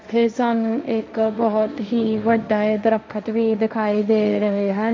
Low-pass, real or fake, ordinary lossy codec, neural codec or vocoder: none; fake; none; codec, 16 kHz, 1.1 kbps, Voila-Tokenizer